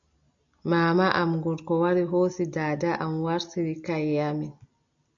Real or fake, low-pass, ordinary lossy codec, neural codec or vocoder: real; 7.2 kHz; MP3, 64 kbps; none